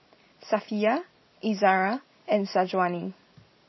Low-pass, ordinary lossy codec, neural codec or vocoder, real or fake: 7.2 kHz; MP3, 24 kbps; none; real